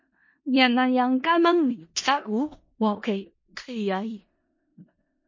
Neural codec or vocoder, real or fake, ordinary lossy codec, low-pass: codec, 16 kHz in and 24 kHz out, 0.4 kbps, LongCat-Audio-Codec, four codebook decoder; fake; MP3, 32 kbps; 7.2 kHz